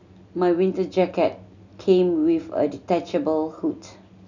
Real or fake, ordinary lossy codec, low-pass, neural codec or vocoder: real; none; 7.2 kHz; none